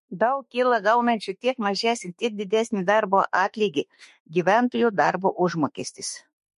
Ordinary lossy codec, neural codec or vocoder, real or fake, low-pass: MP3, 48 kbps; autoencoder, 48 kHz, 32 numbers a frame, DAC-VAE, trained on Japanese speech; fake; 14.4 kHz